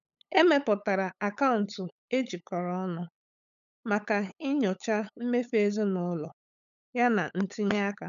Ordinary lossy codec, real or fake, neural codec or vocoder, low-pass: none; fake; codec, 16 kHz, 8 kbps, FunCodec, trained on LibriTTS, 25 frames a second; 7.2 kHz